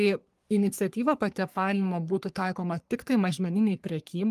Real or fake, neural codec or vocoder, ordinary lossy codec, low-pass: fake; codec, 44.1 kHz, 3.4 kbps, Pupu-Codec; Opus, 32 kbps; 14.4 kHz